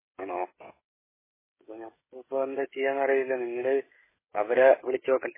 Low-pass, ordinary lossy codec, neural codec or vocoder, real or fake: 3.6 kHz; MP3, 16 kbps; codec, 16 kHz, 16 kbps, FreqCodec, smaller model; fake